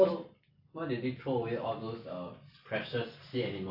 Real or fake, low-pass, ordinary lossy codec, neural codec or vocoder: real; 5.4 kHz; AAC, 48 kbps; none